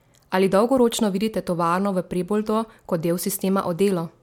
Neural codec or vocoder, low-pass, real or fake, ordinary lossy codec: none; 19.8 kHz; real; MP3, 96 kbps